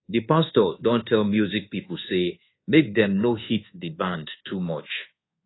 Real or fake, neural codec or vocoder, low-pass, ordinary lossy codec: fake; codec, 24 kHz, 1.2 kbps, DualCodec; 7.2 kHz; AAC, 16 kbps